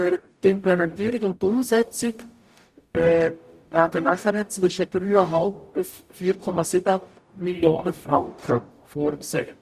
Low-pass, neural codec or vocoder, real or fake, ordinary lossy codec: 14.4 kHz; codec, 44.1 kHz, 0.9 kbps, DAC; fake; Opus, 64 kbps